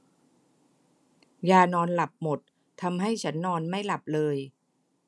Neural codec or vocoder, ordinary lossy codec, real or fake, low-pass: none; none; real; none